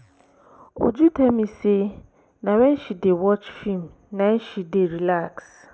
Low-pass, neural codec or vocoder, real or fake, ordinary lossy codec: none; none; real; none